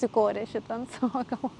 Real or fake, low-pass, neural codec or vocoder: real; 10.8 kHz; none